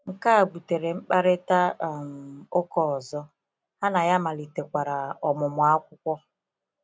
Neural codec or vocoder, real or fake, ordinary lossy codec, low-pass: none; real; none; none